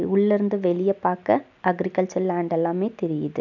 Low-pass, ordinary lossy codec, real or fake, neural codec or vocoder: 7.2 kHz; none; real; none